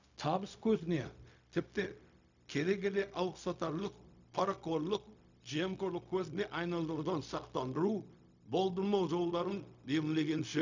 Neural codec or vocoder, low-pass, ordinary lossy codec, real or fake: codec, 16 kHz, 0.4 kbps, LongCat-Audio-Codec; 7.2 kHz; none; fake